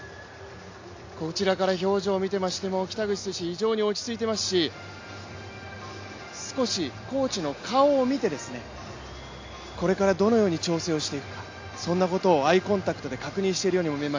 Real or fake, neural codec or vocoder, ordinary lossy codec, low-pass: real; none; AAC, 48 kbps; 7.2 kHz